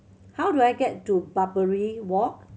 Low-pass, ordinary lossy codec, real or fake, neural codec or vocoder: none; none; real; none